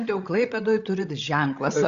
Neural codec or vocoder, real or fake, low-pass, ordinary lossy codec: codec, 16 kHz, 16 kbps, FreqCodec, larger model; fake; 7.2 kHz; Opus, 64 kbps